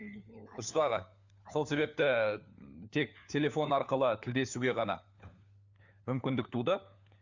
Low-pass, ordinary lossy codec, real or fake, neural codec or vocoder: 7.2 kHz; Opus, 64 kbps; fake; codec, 16 kHz, 4 kbps, FunCodec, trained on LibriTTS, 50 frames a second